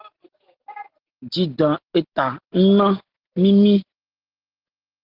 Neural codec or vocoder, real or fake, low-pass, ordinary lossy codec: none; real; 5.4 kHz; Opus, 16 kbps